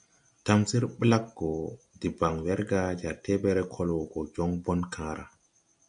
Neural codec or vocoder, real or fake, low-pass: none; real; 9.9 kHz